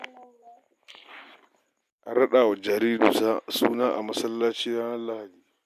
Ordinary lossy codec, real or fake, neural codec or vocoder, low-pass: MP3, 96 kbps; real; none; 14.4 kHz